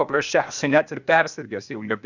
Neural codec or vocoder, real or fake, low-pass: codec, 16 kHz, 0.8 kbps, ZipCodec; fake; 7.2 kHz